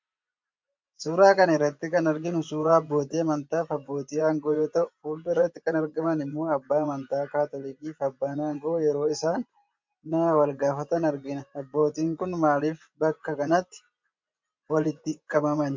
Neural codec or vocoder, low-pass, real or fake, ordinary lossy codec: vocoder, 24 kHz, 100 mel bands, Vocos; 7.2 kHz; fake; MP3, 48 kbps